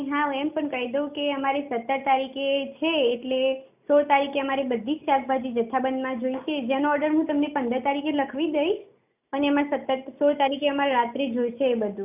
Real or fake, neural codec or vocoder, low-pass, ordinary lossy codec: real; none; 3.6 kHz; none